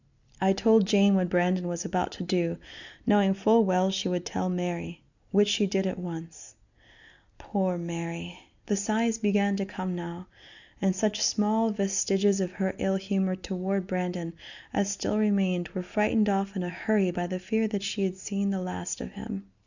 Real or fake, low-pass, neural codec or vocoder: real; 7.2 kHz; none